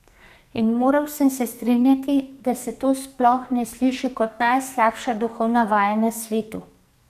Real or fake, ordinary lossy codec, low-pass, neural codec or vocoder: fake; none; 14.4 kHz; codec, 44.1 kHz, 2.6 kbps, SNAC